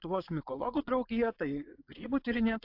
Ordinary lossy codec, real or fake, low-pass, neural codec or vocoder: AAC, 32 kbps; fake; 5.4 kHz; vocoder, 22.05 kHz, 80 mel bands, Vocos